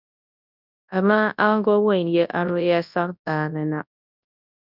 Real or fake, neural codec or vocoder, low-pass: fake; codec, 24 kHz, 0.9 kbps, WavTokenizer, large speech release; 5.4 kHz